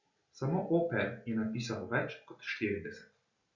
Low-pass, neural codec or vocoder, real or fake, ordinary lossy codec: 7.2 kHz; none; real; Opus, 64 kbps